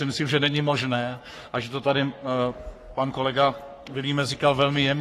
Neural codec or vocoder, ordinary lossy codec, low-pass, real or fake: codec, 44.1 kHz, 3.4 kbps, Pupu-Codec; AAC, 48 kbps; 14.4 kHz; fake